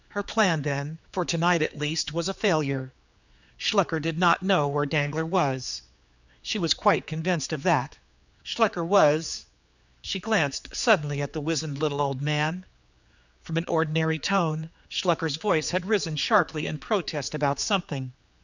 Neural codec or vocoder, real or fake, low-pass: codec, 16 kHz, 4 kbps, X-Codec, HuBERT features, trained on general audio; fake; 7.2 kHz